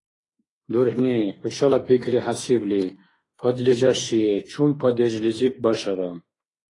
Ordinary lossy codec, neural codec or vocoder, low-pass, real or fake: AAC, 32 kbps; autoencoder, 48 kHz, 32 numbers a frame, DAC-VAE, trained on Japanese speech; 10.8 kHz; fake